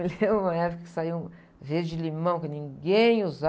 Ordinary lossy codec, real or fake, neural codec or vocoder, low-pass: none; real; none; none